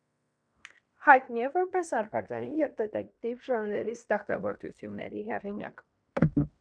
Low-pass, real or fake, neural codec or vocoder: 9.9 kHz; fake; codec, 16 kHz in and 24 kHz out, 0.9 kbps, LongCat-Audio-Codec, fine tuned four codebook decoder